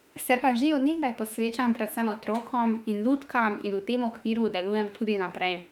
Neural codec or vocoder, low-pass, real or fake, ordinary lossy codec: autoencoder, 48 kHz, 32 numbers a frame, DAC-VAE, trained on Japanese speech; 19.8 kHz; fake; none